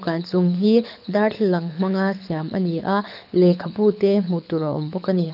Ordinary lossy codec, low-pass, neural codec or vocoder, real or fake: none; 5.4 kHz; codec, 24 kHz, 6 kbps, HILCodec; fake